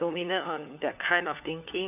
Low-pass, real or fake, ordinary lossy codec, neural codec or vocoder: 3.6 kHz; fake; none; codec, 16 kHz, 4 kbps, FunCodec, trained on LibriTTS, 50 frames a second